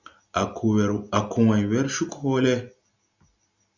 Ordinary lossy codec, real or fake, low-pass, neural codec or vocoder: Opus, 64 kbps; real; 7.2 kHz; none